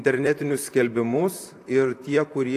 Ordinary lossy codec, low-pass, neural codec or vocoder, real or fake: AAC, 64 kbps; 14.4 kHz; vocoder, 44.1 kHz, 128 mel bands, Pupu-Vocoder; fake